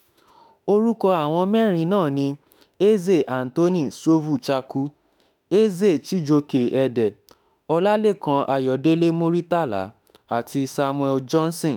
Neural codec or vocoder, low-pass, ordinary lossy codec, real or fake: autoencoder, 48 kHz, 32 numbers a frame, DAC-VAE, trained on Japanese speech; 19.8 kHz; none; fake